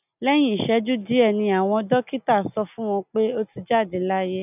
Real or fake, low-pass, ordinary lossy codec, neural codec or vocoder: real; 3.6 kHz; none; none